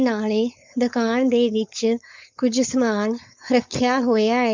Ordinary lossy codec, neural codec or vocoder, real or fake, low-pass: MP3, 64 kbps; codec, 16 kHz, 4.8 kbps, FACodec; fake; 7.2 kHz